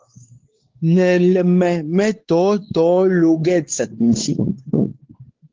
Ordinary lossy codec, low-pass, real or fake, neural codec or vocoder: Opus, 16 kbps; 7.2 kHz; fake; codec, 16 kHz, 4 kbps, X-Codec, WavLM features, trained on Multilingual LibriSpeech